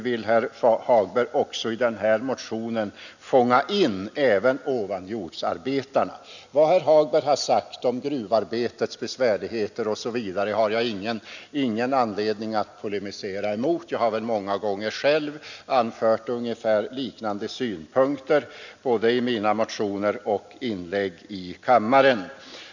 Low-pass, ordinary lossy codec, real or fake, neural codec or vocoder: 7.2 kHz; none; real; none